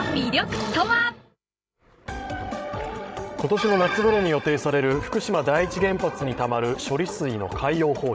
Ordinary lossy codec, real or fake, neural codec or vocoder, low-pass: none; fake; codec, 16 kHz, 16 kbps, FreqCodec, larger model; none